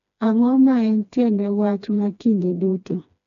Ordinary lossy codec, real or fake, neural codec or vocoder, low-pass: none; fake; codec, 16 kHz, 2 kbps, FreqCodec, smaller model; 7.2 kHz